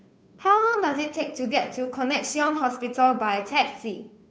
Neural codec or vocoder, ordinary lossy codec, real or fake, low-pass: codec, 16 kHz, 2 kbps, FunCodec, trained on Chinese and English, 25 frames a second; none; fake; none